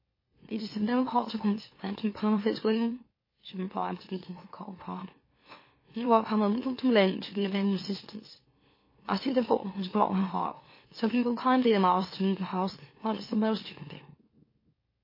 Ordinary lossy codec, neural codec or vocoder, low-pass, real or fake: MP3, 24 kbps; autoencoder, 44.1 kHz, a latent of 192 numbers a frame, MeloTTS; 5.4 kHz; fake